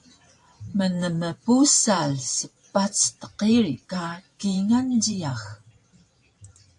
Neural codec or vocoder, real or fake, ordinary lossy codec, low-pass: vocoder, 44.1 kHz, 128 mel bands every 512 samples, BigVGAN v2; fake; AAC, 64 kbps; 10.8 kHz